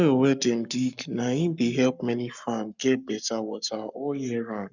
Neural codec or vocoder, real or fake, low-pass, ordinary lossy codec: codec, 44.1 kHz, 7.8 kbps, Pupu-Codec; fake; 7.2 kHz; none